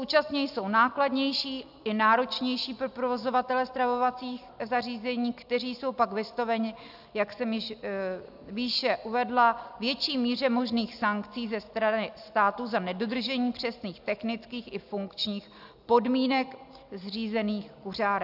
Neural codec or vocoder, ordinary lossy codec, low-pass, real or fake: none; AAC, 48 kbps; 5.4 kHz; real